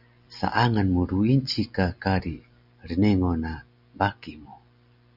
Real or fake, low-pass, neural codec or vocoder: real; 5.4 kHz; none